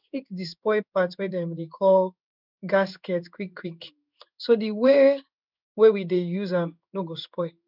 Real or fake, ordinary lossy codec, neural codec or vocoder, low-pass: fake; none; codec, 16 kHz in and 24 kHz out, 1 kbps, XY-Tokenizer; 5.4 kHz